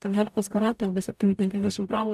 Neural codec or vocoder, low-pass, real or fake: codec, 44.1 kHz, 0.9 kbps, DAC; 14.4 kHz; fake